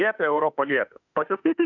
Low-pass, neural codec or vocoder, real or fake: 7.2 kHz; autoencoder, 48 kHz, 32 numbers a frame, DAC-VAE, trained on Japanese speech; fake